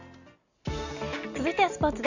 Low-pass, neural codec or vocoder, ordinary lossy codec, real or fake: 7.2 kHz; none; none; real